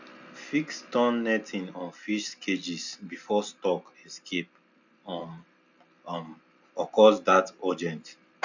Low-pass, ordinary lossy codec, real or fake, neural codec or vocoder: 7.2 kHz; none; real; none